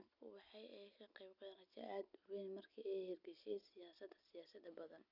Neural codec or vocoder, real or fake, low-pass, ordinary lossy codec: none; real; 5.4 kHz; none